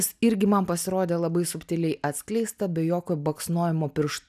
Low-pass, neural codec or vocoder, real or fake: 14.4 kHz; none; real